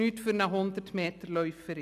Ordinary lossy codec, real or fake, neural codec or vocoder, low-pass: none; real; none; 14.4 kHz